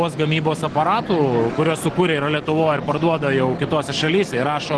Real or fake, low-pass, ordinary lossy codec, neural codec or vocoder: real; 10.8 kHz; Opus, 16 kbps; none